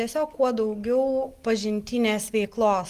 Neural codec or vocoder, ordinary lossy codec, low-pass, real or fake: none; Opus, 24 kbps; 14.4 kHz; real